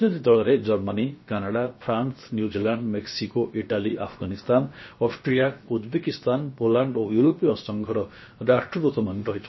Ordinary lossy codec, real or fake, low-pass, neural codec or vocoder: MP3, 24 kbps; fake; 7.2 kHz; codec, 16 kHz, about 1 kbps, DyCAST, with the encoder's durations